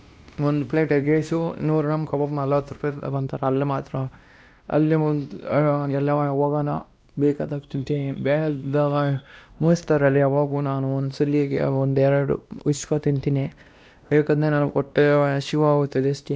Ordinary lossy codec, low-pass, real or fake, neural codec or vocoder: none; none; fake; codec, 16 kHz, 1 kbps, X-Codec, WavLM features, trained on Multilingual LibriSpeech